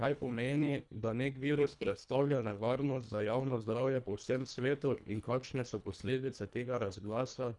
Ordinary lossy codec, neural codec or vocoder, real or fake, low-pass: none; codec, 24 kHz, 1.5 kbps, HILCodec; fake; 10.8 kHz